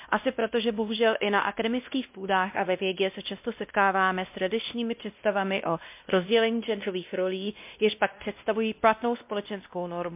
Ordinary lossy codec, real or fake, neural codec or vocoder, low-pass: MP3, 32 kbps; fake; codec, 16 kHz, 1 kbps, X-Codec, WavLM features, trained on Multilingual LibriSpeech; 3.6 kHz